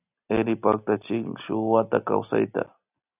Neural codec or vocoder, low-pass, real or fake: none; 3.6 kHz; real